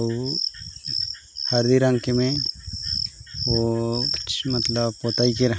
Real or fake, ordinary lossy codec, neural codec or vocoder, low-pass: real; none; none; none